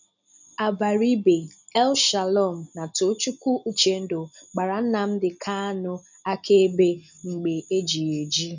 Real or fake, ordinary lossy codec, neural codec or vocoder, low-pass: real; none; none; 7.2 kHz